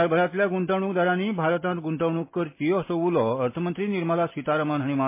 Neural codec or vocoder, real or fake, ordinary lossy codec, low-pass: none; real; MP3, 24 kbps; 3.6 kHz